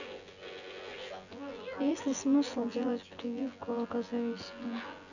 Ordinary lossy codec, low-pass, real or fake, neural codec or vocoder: none; 7.2 kHz; fake; vocoder, 24 kHz, 100 mel bands, Vocos